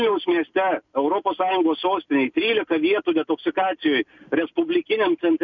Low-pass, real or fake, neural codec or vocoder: 7.2 kHz; real; none